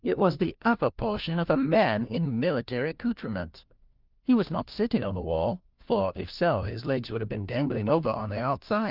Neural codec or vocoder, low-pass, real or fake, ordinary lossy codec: codec, 16 kHz, 1 kbps, FunCodec, trained on LibriTTS, 50 frames a second; 5.4 kHz; fake; Opus, 32 kbps